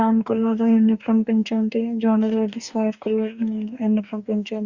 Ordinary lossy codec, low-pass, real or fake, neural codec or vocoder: Opus, 64 kbps; 7.2 kHz; fake; codec, 44.1 kHz, 2.6 kbps, DAC